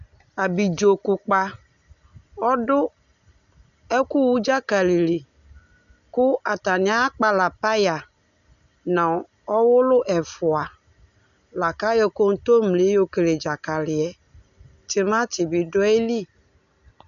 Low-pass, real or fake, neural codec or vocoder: 7.2 kHz; real; none